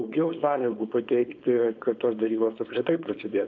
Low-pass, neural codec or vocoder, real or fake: 7.2 kHz; codec, 16 kHz, 4.8 kbps, FACodec; fake